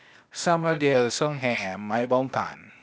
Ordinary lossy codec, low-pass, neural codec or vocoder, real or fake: none; none; codec, 16 kHz, 0.8 kbps, ZipCodec; fake